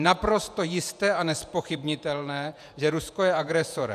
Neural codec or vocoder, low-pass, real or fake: vocoder, 48 kHz, 128 mel bands, Vocos; 14.4 kHz; fake